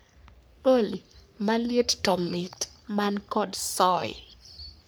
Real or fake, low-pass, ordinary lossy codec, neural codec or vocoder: fake; none; none; codec, 44.1 kHz, 3.4 kbps, Pupu-Codec